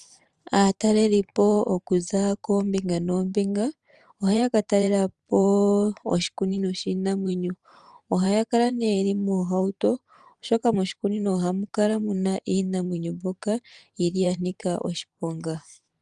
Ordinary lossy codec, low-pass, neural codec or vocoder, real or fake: Opus, 32 kbps; 10.8 kHz; vocoder, 44.1 kHz, 128 mel bands every 512 samples, BigVGAN v2; fake